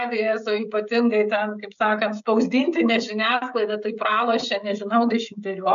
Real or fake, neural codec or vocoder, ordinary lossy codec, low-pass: fake; codec, 16 kHz, 16 kbps, FreqCodec, smaller model; AAC, 96 kbps; 7.2 kHz